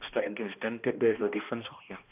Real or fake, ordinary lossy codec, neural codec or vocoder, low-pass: fake; none; codec, 16 kHz, 1 kbps, X-Codec, HuBERT features, trained on balanced general audio; 3.6 kHz